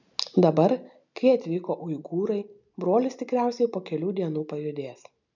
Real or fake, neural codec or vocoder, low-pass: real; none; 7.2 kHz